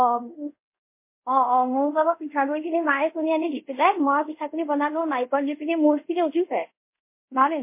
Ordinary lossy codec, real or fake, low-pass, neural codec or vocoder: MP3, 24 kbps; fake; 3.6 kHz; codec, 24 kHz, 0.5 kbps, DualCodec